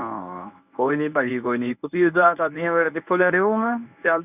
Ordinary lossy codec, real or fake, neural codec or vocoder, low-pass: none; fake; codec, 24 kHz, 0.9 kbps, WavTokenizer, medium speech release version 1; 3.6 kHz